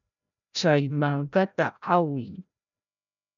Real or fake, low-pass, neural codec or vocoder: fake; 7.2 kHz; codec, 16 kHz, 0.5 kbps, FreqCodec, larger model